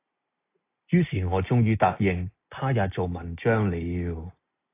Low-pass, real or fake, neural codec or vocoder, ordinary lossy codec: 3.6 kHz; real; none; AAC, 24 kbps